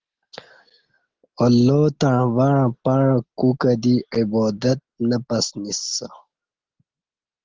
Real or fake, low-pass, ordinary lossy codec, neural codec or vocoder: real; 7.2 kHz; Opus, 16 kbps; none